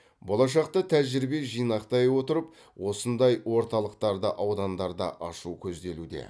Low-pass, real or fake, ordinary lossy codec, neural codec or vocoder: none; real; none; none